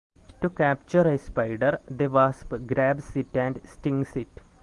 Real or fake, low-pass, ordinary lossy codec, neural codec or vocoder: real; 10.8 kHz; Opus, 24 kbps; none